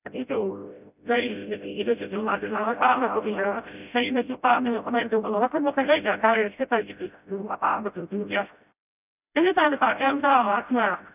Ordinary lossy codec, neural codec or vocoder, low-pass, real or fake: none; codec, 16 kHz, 0.5 kbps, FreqCodec, smaller model; 3.6 kHz; fake